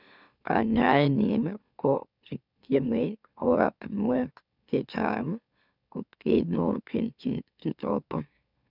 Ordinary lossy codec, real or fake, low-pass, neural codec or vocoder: none; fake; 5.4 kHz; autoencoder, 44.1 kHz, a latent of 192 numbers a frame, MeloTTS